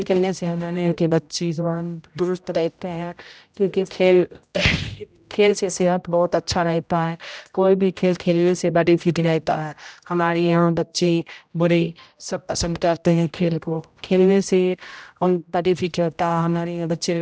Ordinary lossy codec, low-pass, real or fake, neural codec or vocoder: none; none; fake; codec, 16 kHz, 0.5 kbps, X-Codec, HuBERT features, trained on general audio